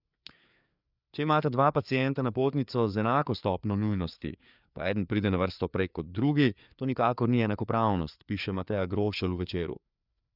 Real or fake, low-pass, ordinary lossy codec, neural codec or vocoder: fake; 5.4 kHz; none; codec, 16 kHz, 4 kbps, FreqCodec, larger model